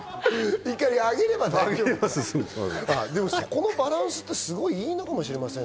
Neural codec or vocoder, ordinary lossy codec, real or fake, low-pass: none; none; real; none